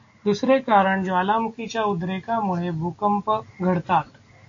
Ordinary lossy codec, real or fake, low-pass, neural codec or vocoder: AAC, 48 kbps; real; 7.2 kHz; none